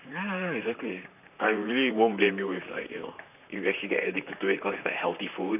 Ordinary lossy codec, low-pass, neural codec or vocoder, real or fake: none; 3.6 kHz; vocoder, 44.1 kHz, 128 mel bands, Pupu-Vocoder; fake